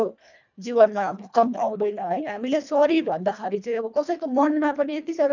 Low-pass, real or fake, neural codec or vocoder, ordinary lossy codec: 7.2 kHz; fake; codec, 24 kHz, 1.5 kbps, HILCodec; none